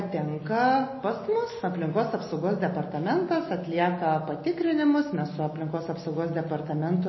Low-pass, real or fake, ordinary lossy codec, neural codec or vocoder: 7.2 kHz; real; MP3, 24 kbps; none